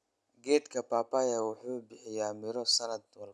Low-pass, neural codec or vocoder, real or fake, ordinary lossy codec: none; none; real; none